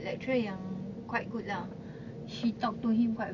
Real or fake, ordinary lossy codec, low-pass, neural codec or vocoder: real; none; 7.2 kHz; none